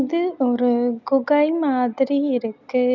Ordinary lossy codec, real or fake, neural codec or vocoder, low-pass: none; real; none; 7.2 kHz